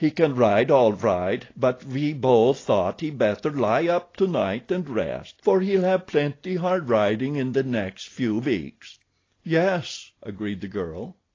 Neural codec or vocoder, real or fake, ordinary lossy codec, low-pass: none; real; AAC, 32 kbps; 7.2 kHz